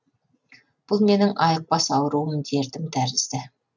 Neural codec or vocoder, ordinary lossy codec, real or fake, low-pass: none; none; real; 7.2 kHz